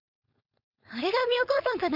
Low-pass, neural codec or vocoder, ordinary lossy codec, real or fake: 5.4 kHz; codec, 16 kHz, 4.8 kbps, FACodec; none; fake